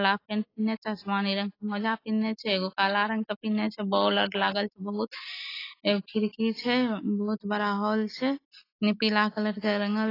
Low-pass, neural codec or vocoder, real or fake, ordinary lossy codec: 5.4 kHz; none; real; AAC, 24 kbps